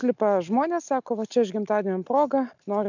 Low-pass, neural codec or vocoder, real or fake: 7.2 kHz; none; real